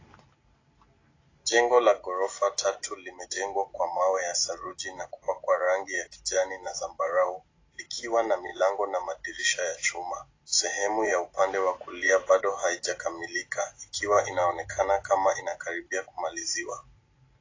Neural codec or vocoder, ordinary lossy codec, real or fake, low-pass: none; AAC, 32 kbps; real; 7.2 kHz